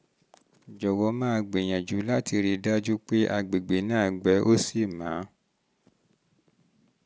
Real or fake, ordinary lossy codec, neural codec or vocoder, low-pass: real; none; none; none